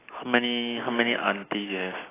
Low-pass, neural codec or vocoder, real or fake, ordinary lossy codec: 3.6 kHz; none; real; AAC, 16 kbps